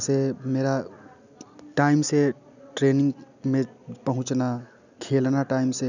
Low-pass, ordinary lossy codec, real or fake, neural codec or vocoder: 7.2 kHz; none; real; none